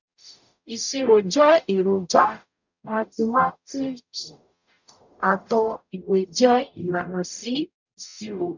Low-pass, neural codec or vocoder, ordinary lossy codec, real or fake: 7.2 kHz; codec, 44.1 kHz, 0.9 kbps, DAC; none; fake